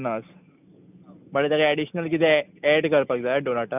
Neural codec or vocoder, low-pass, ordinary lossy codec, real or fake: none; 3.6 kHz; none; real